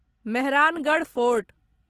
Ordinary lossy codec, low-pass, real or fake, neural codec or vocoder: Opus, 16 kbps; 14.4 kHz; real; none